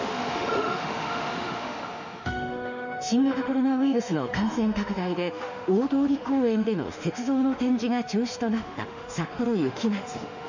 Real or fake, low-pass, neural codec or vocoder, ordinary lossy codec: fake; 7.2 kHz; autoencoder, 48 kHz, 32 numbers a frame, DAC-VAE, trained on Japanese speech; none